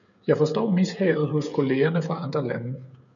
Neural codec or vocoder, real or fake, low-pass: codec, 16 kHz, 16 kbps, FreqCodec, smaller model; fake; 7.2 kHz